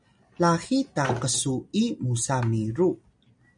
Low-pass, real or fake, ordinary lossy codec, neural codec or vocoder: 9.9 kHz; real; MP3, 96 kbps; none